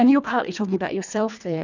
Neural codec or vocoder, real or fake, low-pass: codec, 24 kHz, 1.5 kbps, HILCodec; fake; 7.2 kHz